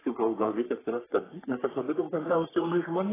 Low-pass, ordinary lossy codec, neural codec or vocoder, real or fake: 3.6 kHz; AAC, 16 kbps; codec, 44.1 kHz, 3.4 kbps, Pupu-Codec; fake